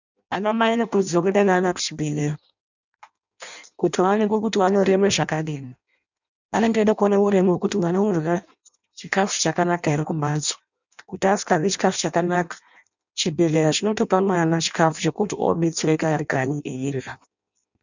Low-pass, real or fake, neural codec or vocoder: 7.2 kHz; fake; codec, 16 kHz in and 24 kHz out, 0.6 kbps, FireRedTTS-2 codec